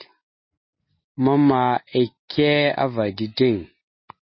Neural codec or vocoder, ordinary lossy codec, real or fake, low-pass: none; MP3, 24 kbps; real; 7.2 kHz